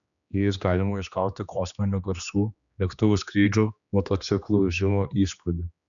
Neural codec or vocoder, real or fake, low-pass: codec, 16 kHz, 2 kbps, X-Codec, HuBERT features, trained on general audio; fake; 7.2 kHz